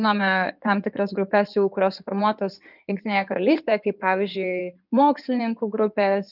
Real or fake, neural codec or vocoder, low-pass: fake; codec, 16 kHz in and 24 kHz out, 2.2 kbps, FireRedTTS-2 codec; 5.4 kHz